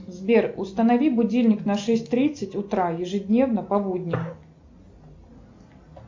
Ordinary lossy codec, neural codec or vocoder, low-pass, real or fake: MP3, 64 kbps; none; 7.2 kHz; real